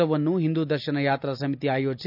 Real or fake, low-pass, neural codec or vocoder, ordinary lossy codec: real; 5.4 kHz; none; none